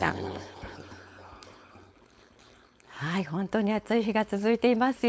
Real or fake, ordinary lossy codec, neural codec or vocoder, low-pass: fake; none; codec, 16 kHz, 4.8 kbps, FACodec; none